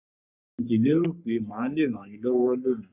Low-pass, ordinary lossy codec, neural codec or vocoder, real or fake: 3.6 kHz; none; codec, 44.1 kHz, 3.4 kbps, Pupu-Codec; fake